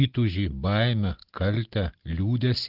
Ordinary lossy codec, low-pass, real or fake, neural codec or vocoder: Opus, 16 kbps; 5.4 kHz; real; none